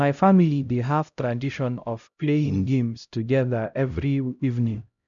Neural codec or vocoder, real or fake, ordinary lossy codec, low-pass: codec, 16 kHz, 0.5 kbps, X-Codec, HuBERT features, trained on LibriSpeech; fake; none; 7.2 kHz